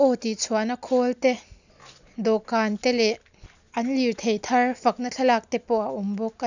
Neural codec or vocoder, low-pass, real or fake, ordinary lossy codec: none; 7.2 kHz; real; none